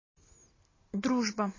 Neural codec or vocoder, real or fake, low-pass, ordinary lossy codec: none; real; 7.2 kHz; MP3, 32 kbps